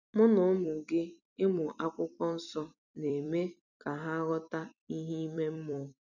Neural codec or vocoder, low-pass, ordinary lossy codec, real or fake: vocoder, 44.1 kHz, 128 mel bands every 256 samples, BigVGAN v2; 7.2 kHz; none; fake